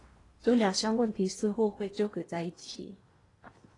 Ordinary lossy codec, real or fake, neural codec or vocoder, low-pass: AAC, 32 kbps; fake; codec, 16 kHz in and 24 kHz out, 0.8 kbps, FocalCodec, streaming, 65536 codes; 10.8 kHz